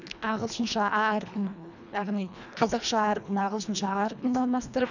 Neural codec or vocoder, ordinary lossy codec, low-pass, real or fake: codec, 24 kHz, 1.5 kbps, HILCodec; none; 7.2 kHz; fake